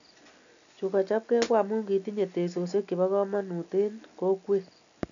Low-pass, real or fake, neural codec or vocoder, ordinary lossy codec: 7.2 kHz; real; none; MP3, 96 kbps